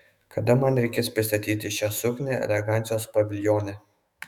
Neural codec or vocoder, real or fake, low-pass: autoencoder, 48 kHz, 128 numbers a frame, DAC-VAE, trained on Japanese speech; fake; 19.8 kHz